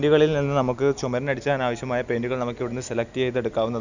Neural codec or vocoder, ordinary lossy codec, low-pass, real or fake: none; none; 7.2 kHz; real